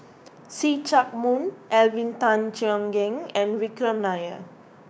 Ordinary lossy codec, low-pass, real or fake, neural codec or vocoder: none; none; fake; codec, 16 kHz, 6 kbps, DAC